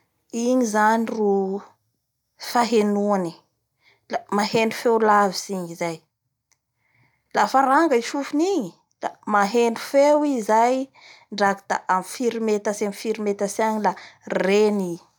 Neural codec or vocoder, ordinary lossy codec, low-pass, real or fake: none; none; 19.8 kHz; real